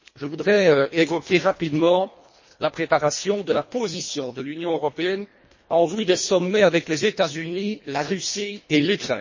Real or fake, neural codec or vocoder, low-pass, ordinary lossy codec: fake; codec, 24 kHz, 1.5 kbps, HILCodec; 7.2 kHz; MP3, 32 kbps